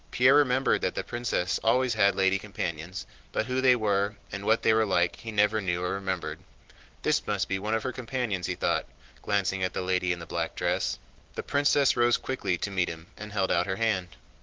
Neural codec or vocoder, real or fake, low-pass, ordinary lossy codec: none; real; 7.2 kHz; Opus, 16 kbps